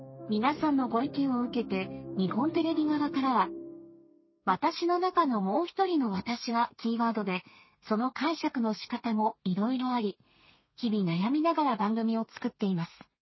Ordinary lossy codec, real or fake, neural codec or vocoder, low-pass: MP3, 24 kbps; fake; codec, 32 kHz, 1.9 kbps, SNAC; 7.2 kHz